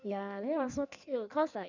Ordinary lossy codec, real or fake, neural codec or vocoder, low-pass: none; fake; codec, 16 kHz in and 24 kHz out, 1.1 kbps, FireRedTTS-2 codec; 7.2 kHz